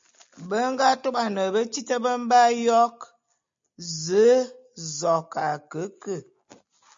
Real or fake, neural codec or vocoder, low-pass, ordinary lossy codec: real; none; 7.2 kHz; MP3, 48 kbps